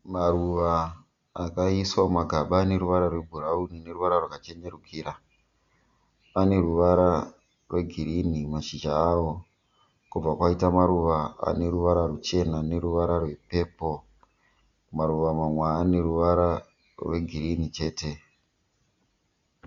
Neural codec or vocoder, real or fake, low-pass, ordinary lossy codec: none; real; 7.2 kHz; Opus, 64 kbps